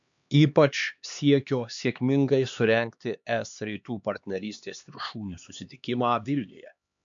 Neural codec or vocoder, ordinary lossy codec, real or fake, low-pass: codec, 16 kHz, 2 kbps, X-Codec, HuBERT features, trained on LibriSpeech; MP3, 64 kbps; fake; 7.2 kHz